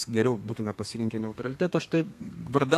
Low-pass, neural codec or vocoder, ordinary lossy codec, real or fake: 14.4 kHz; codec, 32 kHz, 1.9 kbps, SNAC; AAC, 64 kbps; fake